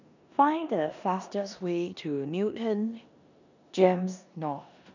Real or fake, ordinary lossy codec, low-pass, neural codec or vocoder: fake; none; 7.2 kHz; codec, 16 kHz in and 24 kHz out, 0.9 kbps, LongCat-Audio-Codec, four codebook decoder